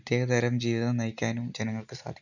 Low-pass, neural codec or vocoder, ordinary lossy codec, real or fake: 7.2 kHz; none; none; real